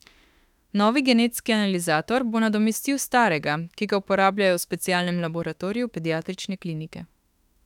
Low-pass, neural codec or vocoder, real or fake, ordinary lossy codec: 19.8 kHz; autoencoder, 48 kHz, 32 numbers a frame, DAC-VAE, trained on Japanese speech; fake; none